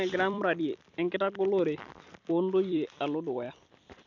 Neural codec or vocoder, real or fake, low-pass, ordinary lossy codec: vocoder, 44.1 kHz, 128 mel bands every 256 samples, BigVGAN v2; fake; 7.2 kHz; none